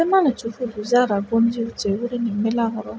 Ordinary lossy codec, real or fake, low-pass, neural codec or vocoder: none; real; none; none